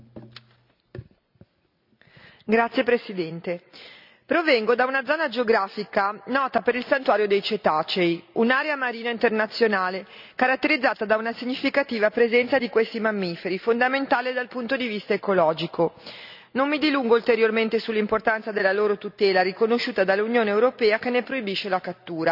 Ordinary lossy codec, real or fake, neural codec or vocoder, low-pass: none; real; none; 5.4 kHz